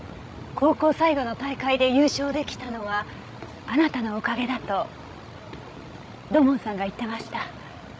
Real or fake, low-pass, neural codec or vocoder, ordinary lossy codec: fake; none; codec, 16 kHz, 16 kbps, FreqCodec, larger model; none